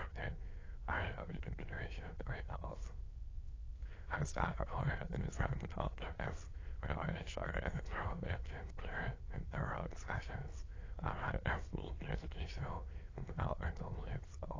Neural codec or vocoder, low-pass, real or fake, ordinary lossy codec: autoencoder, 22.05 kHz, a latent of 192 numbers a frame, VITS, trained on many speakers; 7.2 kHz; fake; AAC, 32 kbps